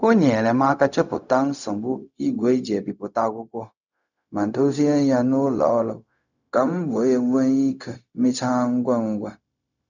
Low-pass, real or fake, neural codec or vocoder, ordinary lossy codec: 7.2 kHz; fake; codec, 16 kHz, 0.4 kbps, LongCat-Audio-Codec; none